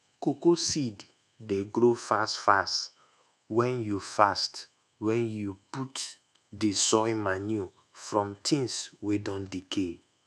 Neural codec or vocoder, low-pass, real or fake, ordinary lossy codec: codec, 24 kHz, 1.2 kbps, DualCodec; none; fake; none